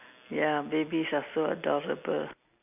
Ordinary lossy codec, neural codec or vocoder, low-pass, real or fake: none; none; 3.6 kHz; real